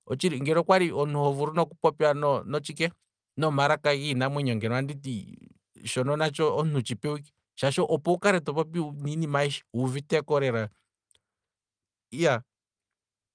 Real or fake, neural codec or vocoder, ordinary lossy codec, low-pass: real; none; Opus, 32 kbps; 9.9 kHz